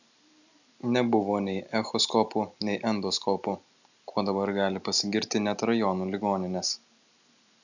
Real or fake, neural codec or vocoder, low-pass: real; none; 7.2 kHz